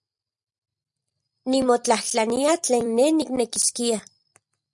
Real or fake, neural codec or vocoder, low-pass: fake; vocoder, 44.1 kHz, 128 mel bands every 256 samples, BigVGAN v2; 10.8 kHz